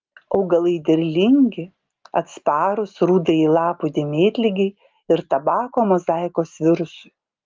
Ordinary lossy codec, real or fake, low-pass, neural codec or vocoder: Opus, 24 kbps; real; 7.2 kHz; none